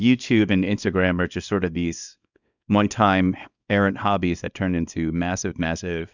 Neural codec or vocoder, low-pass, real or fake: codec, 24 kHz, 0.9 kbps, WavTokenizer, small release; 7.2 kHz; fake